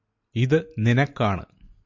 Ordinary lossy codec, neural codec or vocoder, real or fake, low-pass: MP3, 48 kbps; none; real; 7.2 kHz